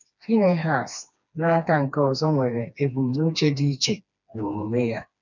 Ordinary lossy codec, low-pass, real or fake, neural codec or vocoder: none; 7.2 kHz; fake; codec, 16 kHz, 2 kbps, FreqCodec, smaller model